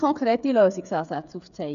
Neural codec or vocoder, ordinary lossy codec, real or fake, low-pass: codec, 16 kHz, 8 kbps, FreqCodec, smaller model; none; fake; 7.2 kHz